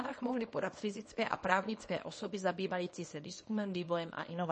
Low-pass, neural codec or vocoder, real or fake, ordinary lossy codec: 10.8 kHz; codec, 24 kHz, 0.9 kbps, WavTokenizer, small release; fake; MP3, 32 kbps